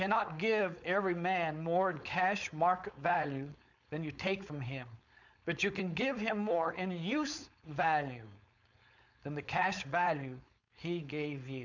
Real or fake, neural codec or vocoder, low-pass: fake; codec, 16 kHz, 4.8 kbps, FACodec; 7.2 kHz